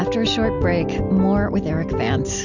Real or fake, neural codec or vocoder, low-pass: real; none; 7.2 kHz